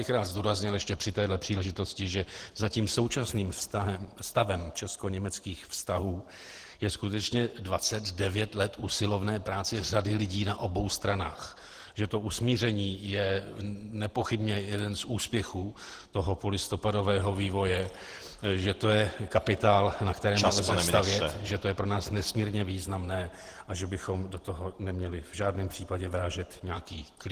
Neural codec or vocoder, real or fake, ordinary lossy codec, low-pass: vocoder, 48 kHz, 128 mel bands, Vocos; fake; Opus, 16 kbps; 14.4 kHz